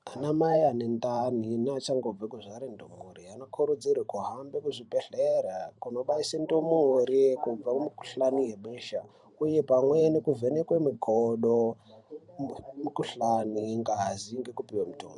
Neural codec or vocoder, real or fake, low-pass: vocoder, 44.1 kHz, 128 mel bands every 512 samples, BigVGAN v2; fake; 10.8 kHz